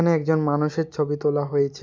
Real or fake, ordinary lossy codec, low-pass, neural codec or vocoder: real; none; none; none